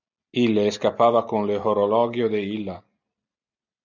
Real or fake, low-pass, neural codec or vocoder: real; 7.2 kHz; none